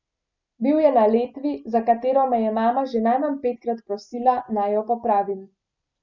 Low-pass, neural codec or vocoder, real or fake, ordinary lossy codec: 7.2 kHz; none; real; none